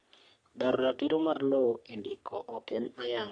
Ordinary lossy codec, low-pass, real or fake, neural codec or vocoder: none; 9.9 kHz; fake; codec, 44.1 kHz, 3.4 kbps, Pupu-Codec